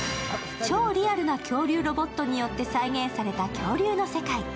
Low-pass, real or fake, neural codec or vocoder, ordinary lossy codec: none; real; none; none